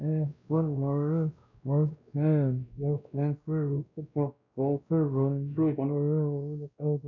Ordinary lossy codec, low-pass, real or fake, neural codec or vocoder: AAC, 48 kbps; 7.2 kHz; fake; codec, 16 kHz, 1 kbps, X-Codec, WavLM features, trained on Multilingual LibriSpeech